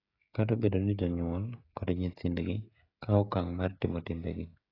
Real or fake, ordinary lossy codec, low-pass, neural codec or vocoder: fake; AAC, 24 kbps; 5.4 kHz; codec, 16 kHz, 16 kbps, FreqCodec, smaller model